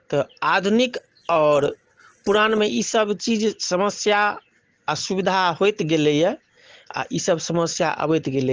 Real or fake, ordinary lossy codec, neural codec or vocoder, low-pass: real; Opus, 16 kbps; none; 7.2 kHz